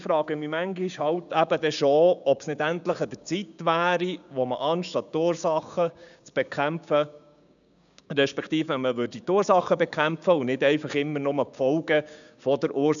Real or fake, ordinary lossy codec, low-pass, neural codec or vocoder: fake; none; 7.2 kHz; codec, 16 kHz, 6 kbps, DAC